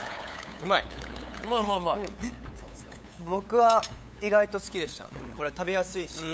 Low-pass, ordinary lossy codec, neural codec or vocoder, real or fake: none; none; codec, 16 kHz, 8 kbps, FunCodec, trained on LibriTTS, 25 frames a second; fake